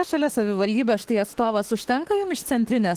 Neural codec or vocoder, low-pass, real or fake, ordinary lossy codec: autoencoder, 48 kHz, 32 numbers a frame, DAC-VAE, trained on Japanese speech; 14.4 kHz; fake; Opus, 16 kbps